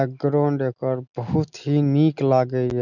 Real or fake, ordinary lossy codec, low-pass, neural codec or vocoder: real; none; 7.2 kHz; none